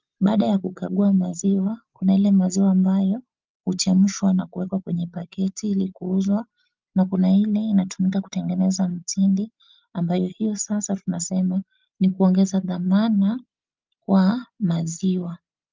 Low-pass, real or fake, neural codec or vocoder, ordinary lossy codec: 7.2 kHz; real; none; Opus, 24 kbps